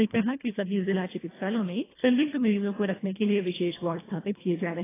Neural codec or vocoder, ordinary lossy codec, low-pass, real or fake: codec, 24 kHz, 1.5 kbps, HILCodec; AAC, 16 kbps; 3.6 kHz; fake